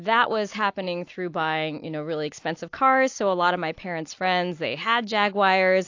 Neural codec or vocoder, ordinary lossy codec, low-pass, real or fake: none; AAC, 48 kbps; 7.2 kHz; real